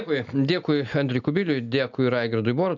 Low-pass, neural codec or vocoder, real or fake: 7.2 kHz; none; real